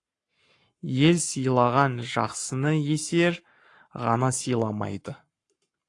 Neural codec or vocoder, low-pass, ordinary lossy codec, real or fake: codec, 44.1 kHz, 7.8 kbps, Pupu-Codec; 10.8 kHz; AAC, 48 kbps; fake